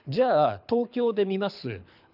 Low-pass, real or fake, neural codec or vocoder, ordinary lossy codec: 5.4 kHz; fake; codec, 24 kHz, 6 kbps, HILCodec; none